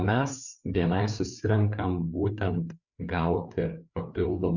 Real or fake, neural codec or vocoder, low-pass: fake; codec, 16 kHz, 4 kbps, FreqCodec, larger model; 7.2 kHz